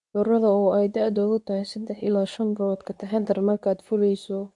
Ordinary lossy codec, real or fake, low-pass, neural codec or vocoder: none; fake; 10.8 kHz; codec, 24 kHz, 0.9 kbps, WavTokenizer, medium speech release version 2